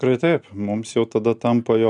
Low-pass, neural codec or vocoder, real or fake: 10.8 kHz; none; real